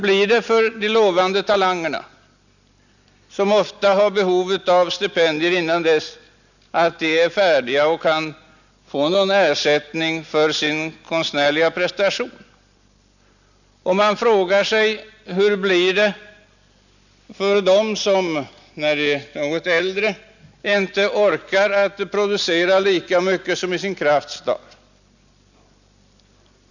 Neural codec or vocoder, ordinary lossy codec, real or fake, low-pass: none; none; real; 7.2 kHz